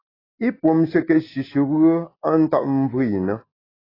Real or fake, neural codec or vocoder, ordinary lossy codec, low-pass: real; none; AAC, 24 kbps; 5.4 kHz